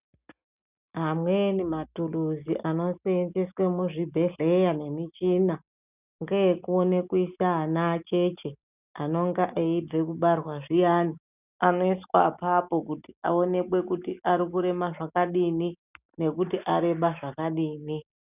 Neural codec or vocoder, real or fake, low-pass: none; real; 3.6 kHz